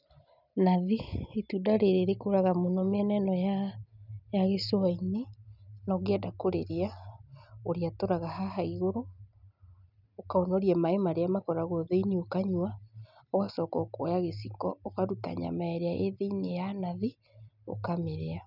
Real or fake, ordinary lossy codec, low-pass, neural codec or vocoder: real; none; 5.4 kHz; none